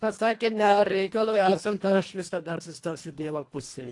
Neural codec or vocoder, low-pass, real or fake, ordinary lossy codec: codec, 24 kHz, 1.5 kbps, HILCodec; 10.8 kHz; fake; AAC, 48 kbps